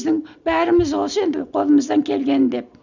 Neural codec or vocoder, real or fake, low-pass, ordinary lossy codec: none; real; 7.2 kHz; none